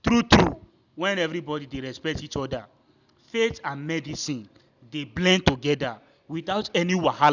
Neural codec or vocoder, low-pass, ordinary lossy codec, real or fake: none; 7.2 kHz; none; real